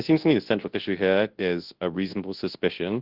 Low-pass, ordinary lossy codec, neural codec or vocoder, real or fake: 5.4 kHz; Opus, 16 kbps; codec, 24 kHz, 0.9 kbps, WavTokenizer, large speech release; fake